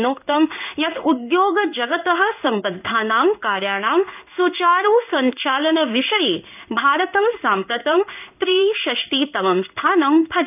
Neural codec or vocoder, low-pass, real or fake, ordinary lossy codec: codec, 16 kHz in and 24 kHz out, 1 kbps, XY-Tokenizer; 3.6 kHz; fake; none